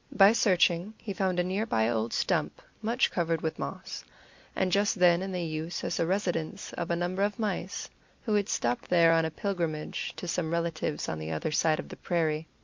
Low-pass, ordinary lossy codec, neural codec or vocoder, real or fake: 7.2 kHz; MP3, 48 kbps; none; real